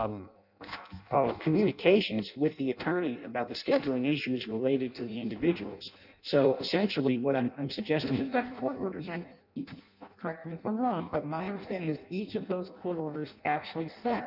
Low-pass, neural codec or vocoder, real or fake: 5.4 kHz; codec, 16 kHz in and 24 kHz out, 0.6 kbps, FireRedTTS-2 codec; fake